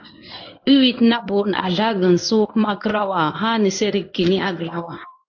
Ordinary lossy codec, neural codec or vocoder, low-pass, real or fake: AAC, 48 kbps; codec, 16 kHz in and 24 kHz out, 1 kbps, XY-Tokenizer; 7.2 kHz; fake